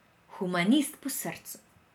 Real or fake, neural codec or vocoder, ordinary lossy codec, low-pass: real; none; none; none